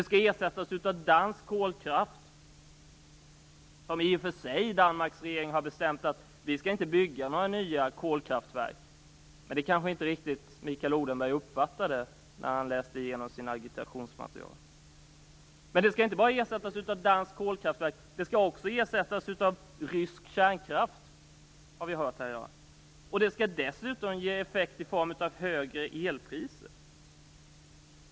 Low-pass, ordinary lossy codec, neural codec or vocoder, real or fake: none; none; none; real